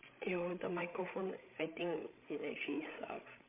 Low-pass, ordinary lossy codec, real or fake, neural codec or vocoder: 3.6 kHz; MP3, 24 kbps; fake; codec, 16 kHz, 16 kbps, FreqCodec, larger model